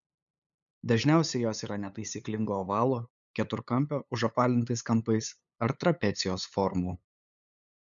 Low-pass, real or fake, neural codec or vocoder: 7.2 kHz; fake; codec, 16 kHz, 8 kbps, FunCodec, trained on LibriTTS, 25 frames a second